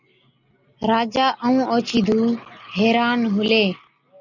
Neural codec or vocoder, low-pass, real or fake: none; 7.2 kHz; real